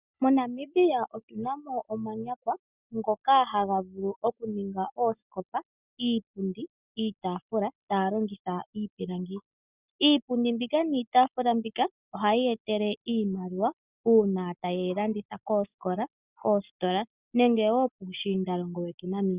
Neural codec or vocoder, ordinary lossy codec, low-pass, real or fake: none; Opus, 64 kbps; 3.6 kHz; real